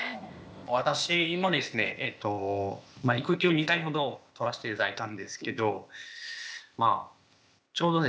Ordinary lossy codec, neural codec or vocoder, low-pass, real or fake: none; codec, 16 kHz, 0.8 kbps, ZipCodec; none; fake